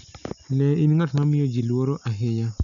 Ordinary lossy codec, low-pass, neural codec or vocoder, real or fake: none; 7.2 kHz; none; real